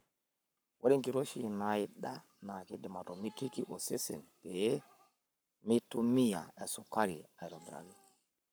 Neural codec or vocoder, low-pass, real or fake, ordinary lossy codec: codec, 44.1 kHz, 7.8 kbps, Pupu-Codec; none; fake; none